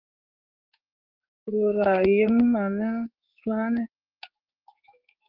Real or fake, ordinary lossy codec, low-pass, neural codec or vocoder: fake; Opus, 24 kbps; 5.4 kHz; codec, 16 kHz in and 24 kHz out, 1 kbps, XY-Tokenizer